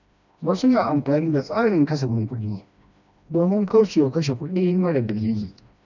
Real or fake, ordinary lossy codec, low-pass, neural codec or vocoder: fake; none; 7.2 kHz; codec, 16 kHz, 1 kbps, FreqCodec, smaller model